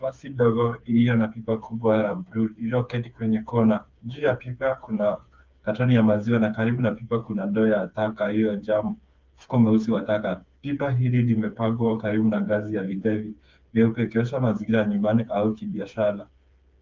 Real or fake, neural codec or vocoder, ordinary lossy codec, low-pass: fake; codec, 16 kHz, 4 kbps, FreqCodec, smaller model; Opus, 24 kbps; 7.2 kHz